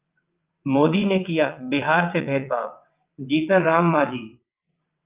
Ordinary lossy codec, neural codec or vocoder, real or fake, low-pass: Opus, 24 kbps; vocoder, 22.05 kHz, 80 mel bands, WaveNeXt; fake; 3.6 kHz